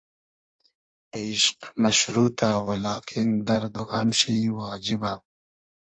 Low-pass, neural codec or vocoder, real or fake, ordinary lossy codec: 9.9 kHz; codec, 16 kHz in and 24 kHz out, 1.1 kbps, FireRedTTS-2 codec; fake; AAC, 64 kbps